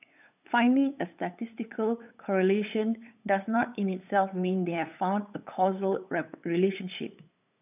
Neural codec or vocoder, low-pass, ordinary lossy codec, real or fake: codec, 16 kHz, 8 kbps, FunCodec, trained on LibriTTS, 25 frames a second; 3.6 kHz; none; fake